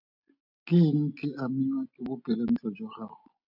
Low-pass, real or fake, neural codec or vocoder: 5.4 kHz; real; none